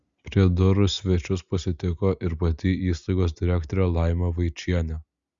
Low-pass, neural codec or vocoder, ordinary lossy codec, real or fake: 7.2 kHz; none; Opus, 64 kbps; real